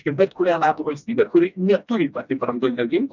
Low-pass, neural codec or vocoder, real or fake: 7.2 kHz; codec, 16 kHz, 1 kbps, FreqCodec, smaller model; fake